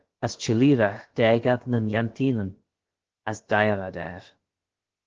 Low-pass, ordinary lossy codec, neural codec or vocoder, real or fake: 7.2 kHz; Opus, 16 kbps; codec, 16 kHz, about 1 kbps, DyCAST, with the encoder's durations; fake